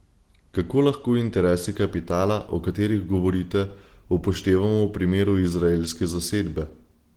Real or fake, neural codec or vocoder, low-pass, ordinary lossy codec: real; none; 19.8 kHz; Opus, 16 kbps